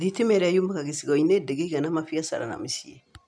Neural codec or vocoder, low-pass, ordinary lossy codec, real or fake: none; none; none; real